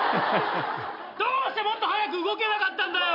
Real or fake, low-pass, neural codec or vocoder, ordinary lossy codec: real; 5.4 kHz; none; MP3, 48 kbps